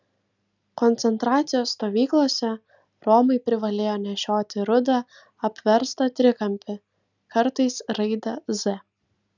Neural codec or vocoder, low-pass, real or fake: none; 7.2 kHz; real